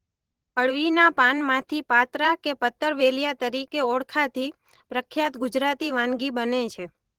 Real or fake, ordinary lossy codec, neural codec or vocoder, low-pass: fake; Opus, 16 kbps; vocoder, 44.1 kHz, 128 mel bands every 512 samples, BigVGAN v2; 19.8 kHz